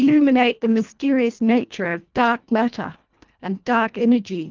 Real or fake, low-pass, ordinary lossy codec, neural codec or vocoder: fake; 7.2 kHz; Opus, 32 kbps; codec, 24 kHz, 1.5 kbps, HILCodec